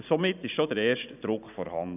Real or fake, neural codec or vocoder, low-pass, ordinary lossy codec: fake; vocoder, 44.1 kHz, 128 mel bands every 512 samples, BigVGAN v2; 3.6 kHz; none